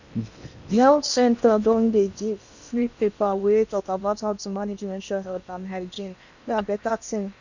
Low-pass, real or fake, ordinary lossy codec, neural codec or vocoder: 7.2 kHz; fake; none; codec, 16 kHz in and 24 kHz out, 0.8 kbps, FocalCodec, streaming, 65536 codes